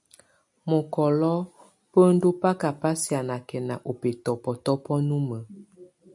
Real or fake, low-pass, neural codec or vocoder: real; 10.8 kHz; none